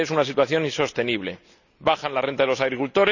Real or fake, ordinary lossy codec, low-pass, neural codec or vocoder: real; none; 7.2 kHz; none